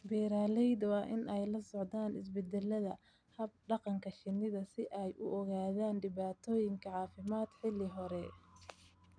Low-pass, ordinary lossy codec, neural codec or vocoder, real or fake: 9.9 kHz; none; none; real